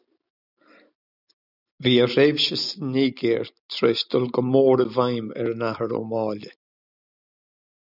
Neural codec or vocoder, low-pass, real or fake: none; 5.4 kHz; real